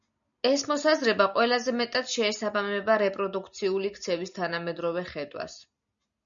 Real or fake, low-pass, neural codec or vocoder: real; 7.2 kHz; none